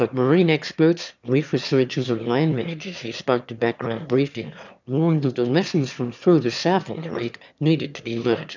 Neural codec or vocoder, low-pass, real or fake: autoencoder, 22.05 kHz, a latent of 192 numbers a frame, VITS, trained on one speaker; 7.2 kHz; fake